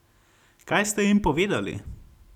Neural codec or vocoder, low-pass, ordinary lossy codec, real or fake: none; 19.8 kHz; none; real